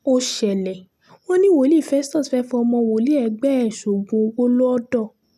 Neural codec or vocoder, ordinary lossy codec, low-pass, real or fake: none; none; 14.4 kHz; real